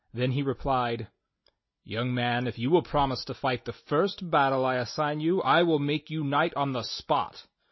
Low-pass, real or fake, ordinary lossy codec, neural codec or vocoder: 7.2 kHz; real; MP3, 24 kbps; none